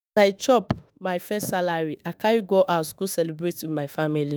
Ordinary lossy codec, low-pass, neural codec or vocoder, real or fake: none; none; autoencoder, 48 kHz, 32 numbers a frame, DAC-VAE, trained on Japanese speech; fake